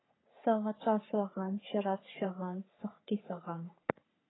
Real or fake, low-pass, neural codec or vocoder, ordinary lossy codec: fake; 7.2 kHz; codec, 44.1 kHz, 3.4 kbps, Pupu-Codec; AAC, 16 kbps